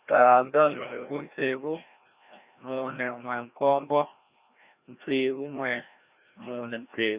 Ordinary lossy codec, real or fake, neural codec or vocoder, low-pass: Opus, 64 kbps; fake; codec, 16 kHz, 1 kbps, FreqCodec, larger model; 3.6 kHz